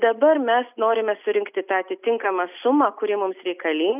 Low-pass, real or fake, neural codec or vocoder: 3.6 kHz; real; none